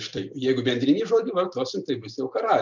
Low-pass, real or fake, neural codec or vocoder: 7.2 kHz; real; none